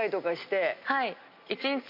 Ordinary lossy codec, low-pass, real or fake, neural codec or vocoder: MP3, 48 kbps; 5.4 kHz; real; none